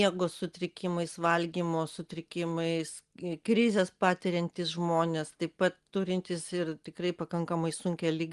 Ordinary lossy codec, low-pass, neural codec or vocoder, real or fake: Opus, 24 kbps; 10.8 kHz; none; real